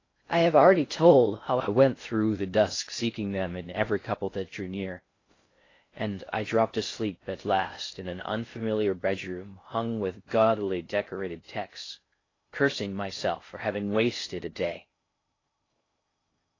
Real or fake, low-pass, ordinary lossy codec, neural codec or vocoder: fake; 7.2 kHz; AAC, 32 kbps; codec, 16 kHz in and 24 kHz out, 0.6 kbps, FocalCodec, streaming, 4096 codes